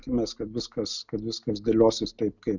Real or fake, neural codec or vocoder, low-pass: real; none; 7.2 kHz